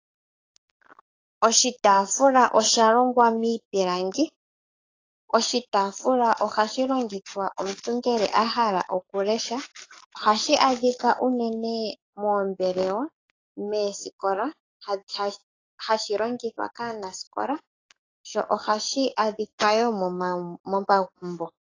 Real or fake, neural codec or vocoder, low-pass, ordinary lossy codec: fake; codec, 44.1 kHz, 7.8 kbps, DAC; 7.2 kHz; AAC, 32 kbps